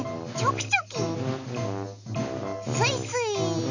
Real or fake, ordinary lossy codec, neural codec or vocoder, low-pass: real; none; none; 7.2 kHz